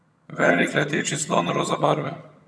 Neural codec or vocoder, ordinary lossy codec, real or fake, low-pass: vocoder, 22.05 kHz, 80 mel bands, HiFi-GAN; none; fake; none